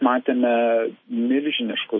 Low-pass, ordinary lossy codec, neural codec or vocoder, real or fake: 7.2 kHz; MP3, 24 kbps; none; real